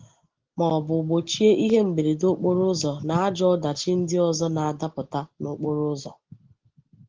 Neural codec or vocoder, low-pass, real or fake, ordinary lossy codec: none; 7.2 kHz; real; Opus, 16 kbps